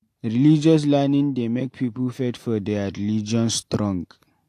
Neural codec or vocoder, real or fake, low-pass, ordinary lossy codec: none; real; 14.4 kHz; AAC, 64 kbps